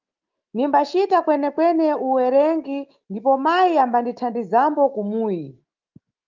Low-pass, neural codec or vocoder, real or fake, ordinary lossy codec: 7.2 kHz; autoencoder, 48 kHz, 128 numbers a frame, DAC-VAE, trained on Japanese speech; fake; Opus, 32 kbps